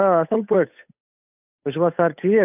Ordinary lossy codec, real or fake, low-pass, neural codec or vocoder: none; fake; 3.6 kHz; codec, 16 kHz, 8 kbps, FunCodec, trained on Chinese and English, 25 frames a second